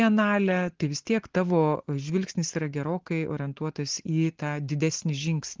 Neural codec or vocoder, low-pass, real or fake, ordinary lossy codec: none; 7.2 kHz; real; Opus, 16 kbps